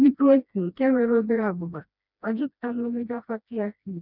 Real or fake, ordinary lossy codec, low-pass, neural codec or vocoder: fake; none; 5.4 kHz; codec, 16 kHz, 1 kbps, FreqCodec, smaller model